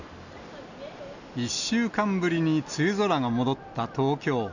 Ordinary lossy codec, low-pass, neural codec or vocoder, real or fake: none; 7.2 kHz; none; real